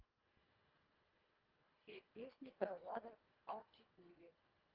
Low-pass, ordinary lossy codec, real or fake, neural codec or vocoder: 5.4 kHz; Opus, 32 kbps; fake; codec, 24 kHz, 1.5 kbps, HILCodec